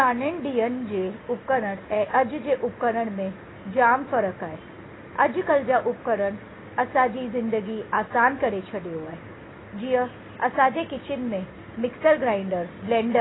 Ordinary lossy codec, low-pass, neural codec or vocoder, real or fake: AAC, 16 kbps; 7.2 kHz; none; real